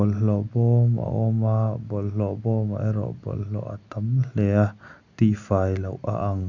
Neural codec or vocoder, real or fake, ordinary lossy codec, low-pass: none; real; none; 7.2 kHz